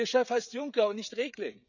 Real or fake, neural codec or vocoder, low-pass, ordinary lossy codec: fake; codec, 16 kHz, 16 kbps, FreqCodec, smaller model; 7.2 kHz; none